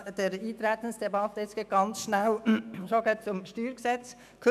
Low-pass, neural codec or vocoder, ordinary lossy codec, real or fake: 14.4 kHz; autoencoder, 48 kHz, 128 numbers a frame, DAC-VAE, trained on Japanese speech; none; fake